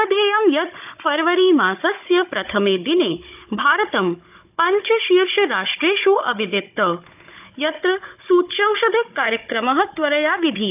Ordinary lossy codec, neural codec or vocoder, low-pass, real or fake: AAC, 32 kbps; codec, 16 kHz, 16 kbps, FunCodec, trained on Chinese and English, 50 frames a second; 3.6 kHz; fake